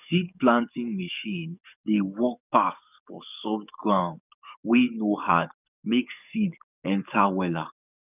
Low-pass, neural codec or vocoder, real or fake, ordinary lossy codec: 3.6 kHz; codec, 44.1 kHz, 7.8 kbps, DAC; fake; none